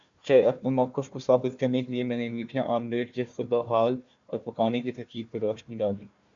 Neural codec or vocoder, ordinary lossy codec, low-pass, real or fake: codec, 16 kHz, 1 kbps, FunCodec, trained on Chinese and English, 50 frames a second; MP3, 64 kbps; 7.2 kHz; fake